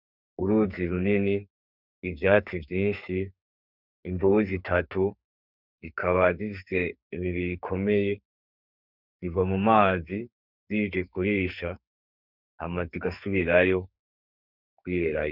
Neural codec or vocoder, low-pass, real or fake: codec, 44.1 kHz, 2.6 kbps, SNAC; 5.4 kHz; fake